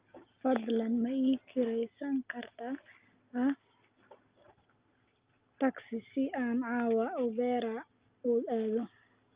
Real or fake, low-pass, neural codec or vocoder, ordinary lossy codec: real; 3.6 kHz; none; Opus, 32 kbps